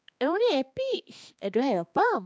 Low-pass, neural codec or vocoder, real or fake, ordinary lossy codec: none; codec, 16 kHz, 2 kbps, X-Codec, HuBERT features, trained on balanced general audio; fake; none